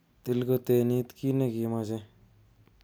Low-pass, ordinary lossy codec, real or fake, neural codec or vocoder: none; none; real; none